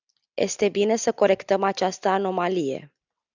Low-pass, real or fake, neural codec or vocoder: 7.2 kHz; real; none